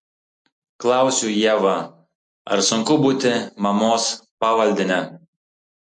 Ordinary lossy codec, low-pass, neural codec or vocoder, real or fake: MP3, 48 kbps; 10.8 kHz; none; real